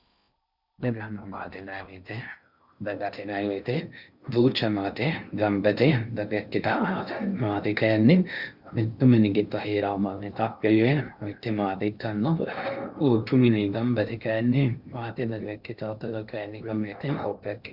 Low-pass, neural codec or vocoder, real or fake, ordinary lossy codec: 5.4 kHz; codec, 16 kHz in and 24 kHz out, 0.6 kbps, FocalCodec, streaming, 4096 codes; fake; Opus, 64 kbps